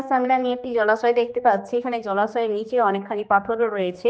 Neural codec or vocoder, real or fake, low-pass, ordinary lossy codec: codec, 16 kHz, 2 kbps, X-Codec, HuBERT features, trained on general audio; fake; none; none